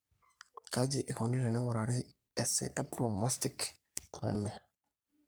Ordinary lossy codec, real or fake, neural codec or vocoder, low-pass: none; fake; codec, 44.1 kHz, 3.4 kbps, Pupu-Codec; none